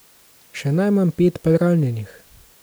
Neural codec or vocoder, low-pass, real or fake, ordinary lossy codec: none; none; real; none